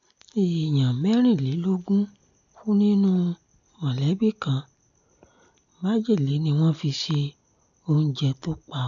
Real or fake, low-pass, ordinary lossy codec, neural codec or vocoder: real; 7.2 kHz; none; none